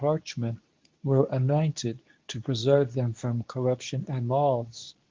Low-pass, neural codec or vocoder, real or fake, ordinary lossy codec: 7.2 kHz; codec, 24 kHz, 0.9 kbps, WavTokenizer, medium speech release version 2; fake; Opus, 24 kbps